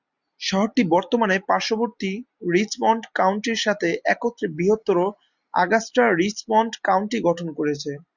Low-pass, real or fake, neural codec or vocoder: 7.2 kHz; real; none